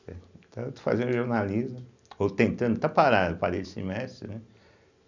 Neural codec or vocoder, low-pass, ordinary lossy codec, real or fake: none; 7.2 kHz; none; real